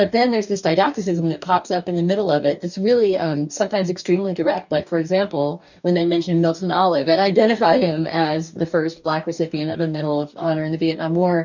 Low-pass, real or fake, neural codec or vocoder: 7.2 kHz; fake; codec, 44.1 kHz, 2.6 kbps, DAC